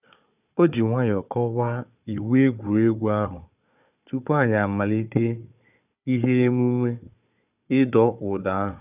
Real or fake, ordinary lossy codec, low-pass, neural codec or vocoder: fake; none; 3.6 kHz; codec, 16 kHz, 4 kbps, FunCodec, trained on Chinese and English, 50 frames a second